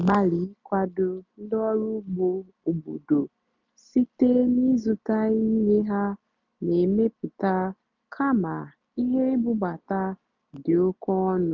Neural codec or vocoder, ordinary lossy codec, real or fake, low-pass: none; none; real; 7.2 kHz